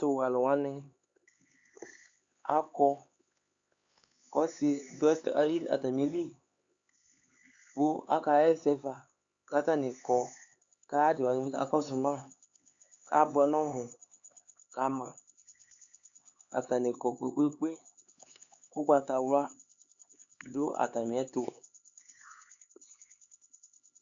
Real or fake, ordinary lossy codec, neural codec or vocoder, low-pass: fake; Opus, 64 kbps; codec, 16 kHz, 4 kbps, X-Codec, HuBERT features, trained on LibriSpeech; 7.2 kHz